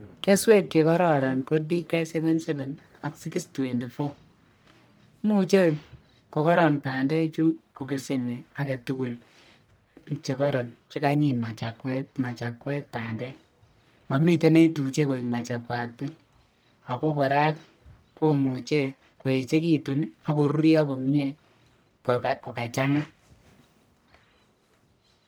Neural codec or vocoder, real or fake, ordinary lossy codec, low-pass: codec, 44.1 kHz, 1.7 kbps, Pupu-Codec; fake; none; none